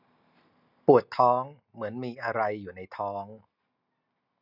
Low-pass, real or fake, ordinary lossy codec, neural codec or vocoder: 5.4 kHz; real; none; none